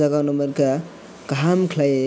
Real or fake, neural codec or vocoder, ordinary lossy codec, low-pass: real; none; none; none